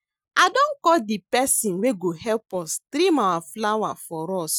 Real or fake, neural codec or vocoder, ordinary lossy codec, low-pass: real; none; none; none